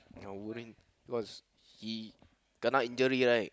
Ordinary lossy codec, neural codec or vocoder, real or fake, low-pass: none; none; real; none